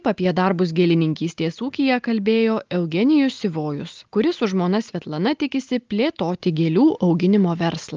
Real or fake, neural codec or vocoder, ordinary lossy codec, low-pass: real; none; Opus, 24 kbps; 7.2 kHz